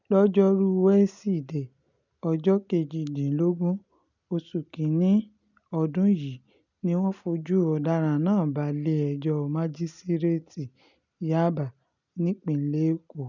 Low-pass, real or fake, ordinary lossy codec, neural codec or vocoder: 7.2 kHz; real; none; none